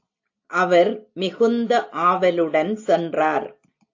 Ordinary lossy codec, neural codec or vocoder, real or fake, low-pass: AAC, 48 kbps; none; real; 7.2 kHz